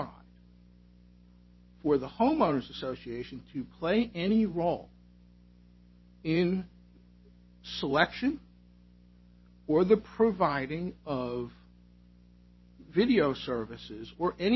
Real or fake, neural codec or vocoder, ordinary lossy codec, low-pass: fake; vocoder, 44.1 kHz, 128 mel bands every 512 samples, BigVGAN v2; MP3, 24 kbps; 7.2 kHz